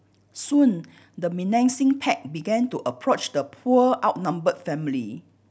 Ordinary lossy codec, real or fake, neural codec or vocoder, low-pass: none; real; none; none